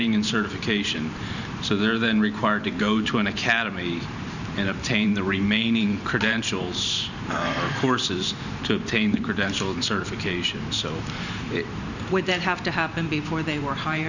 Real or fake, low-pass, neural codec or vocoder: fake; 7.2 kHz; vocoder, 44.1 kHz, 128 mel bands every 512 samples, BigVGAN v2